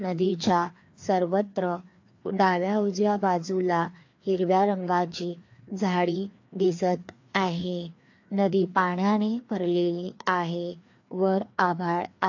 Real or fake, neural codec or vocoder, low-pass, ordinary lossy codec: fake; codec, 16 kHz, 2 kbps, FreqCodec, larger model; 7.2 kHz; AAC, 48 kbps